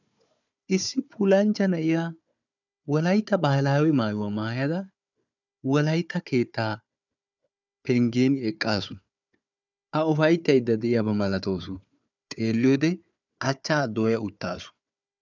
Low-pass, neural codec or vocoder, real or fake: 7.2 kHz; codec, 16 kHz, 4 kbps, FunCodec, trained on Chinese and English, 50 frames a second; fake